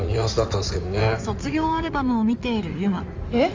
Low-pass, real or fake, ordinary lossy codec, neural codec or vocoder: 7.2 kHz; fake; Opus, 32 kbps; codec, 16 kHz in and 24 kHz out, 2.2 kbps, FireRedTTS-2 codec